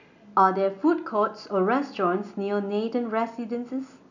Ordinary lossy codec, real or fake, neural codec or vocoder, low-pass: none; real; none; 7.2 kHz